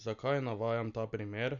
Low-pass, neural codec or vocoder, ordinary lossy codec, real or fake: 7.2 kHz; none; none; real